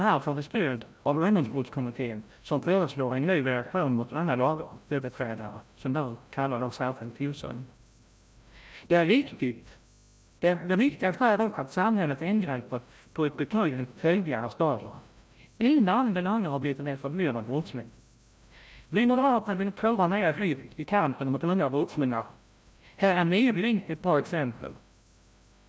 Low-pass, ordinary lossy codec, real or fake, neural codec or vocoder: none; none; fake; codec, 16 kHz, 0.5 kbps, FreqCodec, larger model